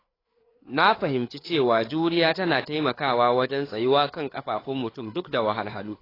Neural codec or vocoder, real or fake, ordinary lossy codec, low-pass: codec, 16 kHz, 4 kbps, FreqCodec, larger model; fake; AAC, 24 kbps; 5.4 kHz